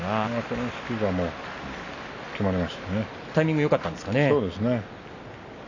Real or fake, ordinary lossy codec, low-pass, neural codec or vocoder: real; none; 7.2 kHz; none